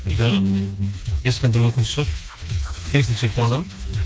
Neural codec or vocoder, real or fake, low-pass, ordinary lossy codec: codec, 16 kHz, 2 kbps, FreqCodec, smaller model; fake; none; none